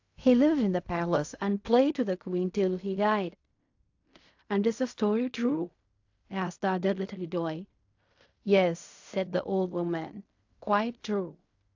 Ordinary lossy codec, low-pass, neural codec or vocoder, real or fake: AAC, 48 kbps; 7.2 kHz; codec, 16 kHz in and 24 kHz out, 0.4 kbps, LongCat-Audio-Codec, fine tuned four codebook decoder; fake